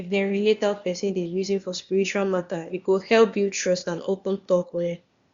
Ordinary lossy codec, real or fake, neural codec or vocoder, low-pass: Opus, 64 kbps; fake; codec, 16 kHz, 0.8 kbps, ZipCodec; 7.2 kHz